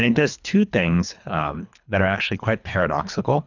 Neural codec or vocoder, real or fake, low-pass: codec, 24 kHz, 3 kbps, HILCodec; fake; 7.2 kHz